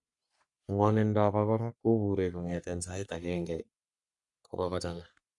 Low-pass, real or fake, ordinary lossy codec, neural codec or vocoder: 10.8 kHz; fake; none; codec, 44.1 kHz, 3.4 kbps, Pupu-Codec